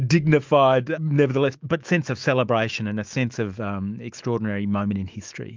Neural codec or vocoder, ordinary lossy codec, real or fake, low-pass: autoencoder, 48 kHz, 128 numbers a frame, DAC-VAE, trained on Japanese speech; Opus, 32 kbps; fake; 7.2 kHz